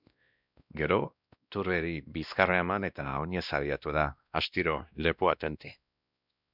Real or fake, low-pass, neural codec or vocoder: fake; 5.4 kHz; codec, 16 kHz, 1 kbps, X-Codec, WavLM features, trained on Multilingual LibriSpeech